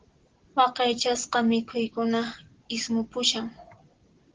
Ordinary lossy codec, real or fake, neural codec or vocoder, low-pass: Opus, 16 kbps; real; none; 7.2 kHz